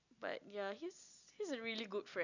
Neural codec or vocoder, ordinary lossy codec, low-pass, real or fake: none; none; 7.2 kHz; real